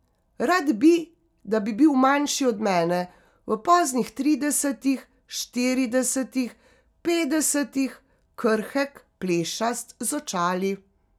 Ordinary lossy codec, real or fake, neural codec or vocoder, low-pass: none; real; none; 19.8 kHz